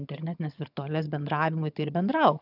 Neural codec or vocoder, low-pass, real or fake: vocoder, 22.05 kHz, 80 mel bands, HiFi-GAN; 5.4 kHz; fake